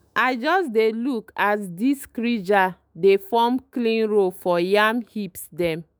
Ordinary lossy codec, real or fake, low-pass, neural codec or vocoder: none; fake; none; autoencoder, 48 kHz, 128 numbers a frame, DAC-VAE, trained on Japanese speech